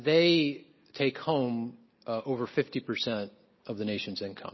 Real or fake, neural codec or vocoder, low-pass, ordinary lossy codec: real; none; 7.2 kHz; MP3, 24 kbps